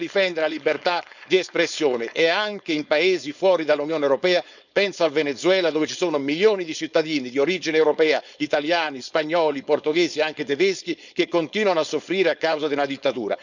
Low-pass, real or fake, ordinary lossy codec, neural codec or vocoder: 7.2 kHz; fake; none; codec, 16 kHz, 4.8 kbps, FACodec